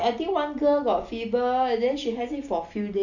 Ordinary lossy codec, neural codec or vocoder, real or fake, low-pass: none; none; real; 7.2 kHz